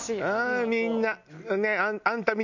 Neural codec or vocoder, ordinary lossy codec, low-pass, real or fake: none; none; 7.2 kHz; real